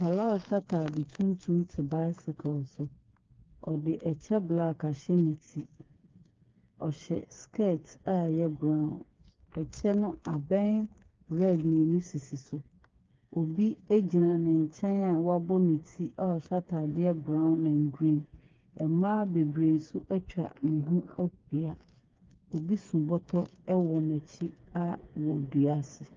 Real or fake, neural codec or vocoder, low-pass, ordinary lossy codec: fake; codec, 16 kHz, 4 kbps, FreqCodec, smaller model; 7.2 kHz; Opus, 24 kbps